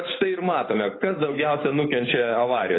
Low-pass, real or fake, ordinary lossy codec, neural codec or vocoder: 7.2 kHz; fake; AAC, 16 kbps; codec, 16 kHz, 8 kbps, FunCodec, trained on Chinese and English, 25 frames a second